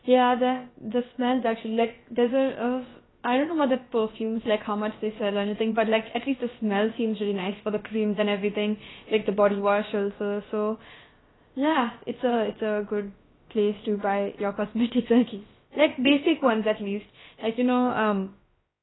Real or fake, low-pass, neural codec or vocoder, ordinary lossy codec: fake; 7.2 kHz; codec, 16 kHz, about 1 kbps, DyCAST, with the encoder's durations; AAC, 16 kbps